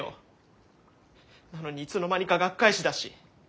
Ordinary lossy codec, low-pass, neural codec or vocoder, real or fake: none; none; none; real